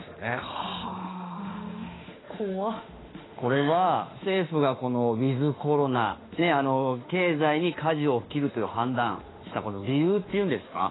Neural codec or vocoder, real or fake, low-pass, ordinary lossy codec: autoencoder, 48 kHz, 32 numbers a frame, DAC-VAE, trained on Japanese speech; fake; 7.2 kHz; AAC, 16 kbps